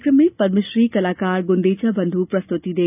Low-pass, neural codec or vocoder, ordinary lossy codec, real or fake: 3.6 kHz; none; none; real